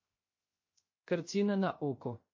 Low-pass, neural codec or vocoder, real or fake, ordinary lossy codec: 7.2 kHz; codec, 16 kHz, 0.3 kbps, FocalCodec; fake; MP3, 32 kbps